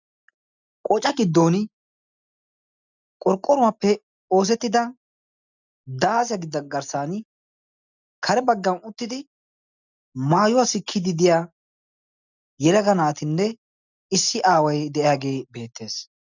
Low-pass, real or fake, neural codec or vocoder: 7.2 kHz; real; none